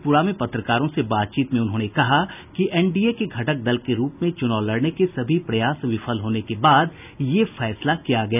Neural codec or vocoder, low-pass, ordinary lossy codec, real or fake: none; 3.6 kHz; none; real